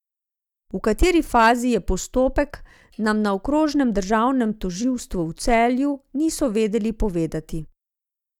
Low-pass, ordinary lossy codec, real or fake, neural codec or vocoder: 19.8 kHz; none; real; none